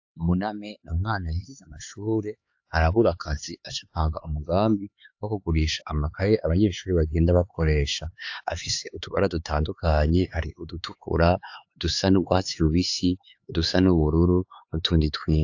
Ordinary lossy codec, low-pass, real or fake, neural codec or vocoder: AAC, 48 kbps; 7.2 kHz; fake; codec, 16 kHz, 4 kbps, X-Codec, HuBERT features, trained on LibriSpeech